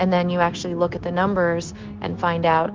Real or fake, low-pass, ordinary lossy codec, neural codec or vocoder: fake; 7.2 kHz; Opus, 16 kbps; codec, 16 kHz, 0.9 kbps, LongCat-Audio-Codec